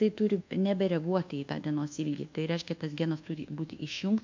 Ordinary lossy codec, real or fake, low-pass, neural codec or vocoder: MP3, 64 kbps; fake; 7.2 kHz; codec, 24 kHz, 1.2 kbps, DualCodec